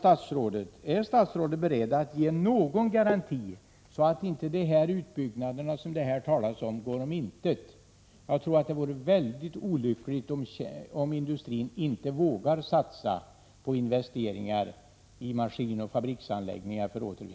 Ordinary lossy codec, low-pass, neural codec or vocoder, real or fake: none; none; none; real